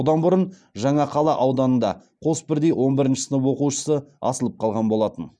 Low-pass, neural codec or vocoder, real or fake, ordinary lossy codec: none; none; real; none